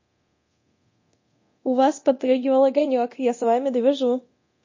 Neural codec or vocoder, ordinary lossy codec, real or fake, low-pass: codec, 24 kHz, 0.9 kbps, DualCodec; MP3, 32 kbps; fake; 7.2 kHz